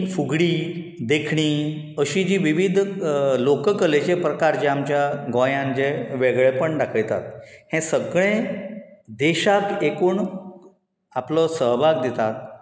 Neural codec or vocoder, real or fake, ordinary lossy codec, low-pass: none; real; none; none